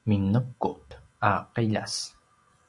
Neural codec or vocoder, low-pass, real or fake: none; 10.8 kHz; real